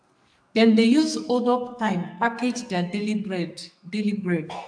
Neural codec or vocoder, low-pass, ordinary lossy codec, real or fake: codec, 44.1 kHz, 2.6 kbps, SNAC; 9.9 kHz; AAC, 64 kbps; fake